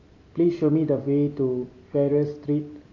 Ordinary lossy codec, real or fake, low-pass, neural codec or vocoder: AAC, 32 kbps; real; 7.2 kHz; none